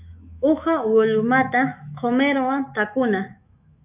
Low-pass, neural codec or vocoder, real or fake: 3.6 kHz; autoencoder, 48 kHz, 128 numbers a frame, DAC-VAE, trained on Japanese speech; fake